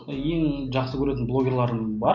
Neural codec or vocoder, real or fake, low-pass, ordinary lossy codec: none; real; 7.2 kHz; none